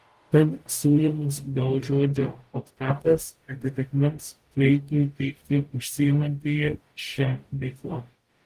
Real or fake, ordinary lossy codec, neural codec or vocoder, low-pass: fake; Opus, 24 kbps; codec, 44.1 kHz, 0.9 kbps, DAC; 14.4 kHz